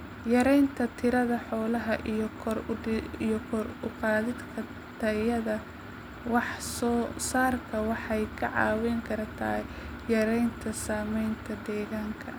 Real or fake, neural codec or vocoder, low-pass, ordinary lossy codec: real; none; none; none